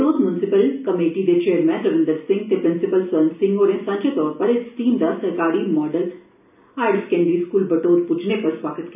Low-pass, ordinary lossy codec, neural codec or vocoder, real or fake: 3.6 kHz; none; none; real